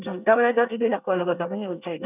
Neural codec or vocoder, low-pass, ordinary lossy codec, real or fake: codec, 24 kHz, 1 kbps, SNAC; 3.6 kHz; none; fake